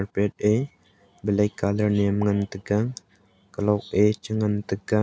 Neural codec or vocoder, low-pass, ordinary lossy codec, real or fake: none; none; none; real